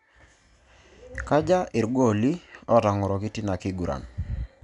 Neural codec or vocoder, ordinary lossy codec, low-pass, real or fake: none; none; 10.8 kHz; real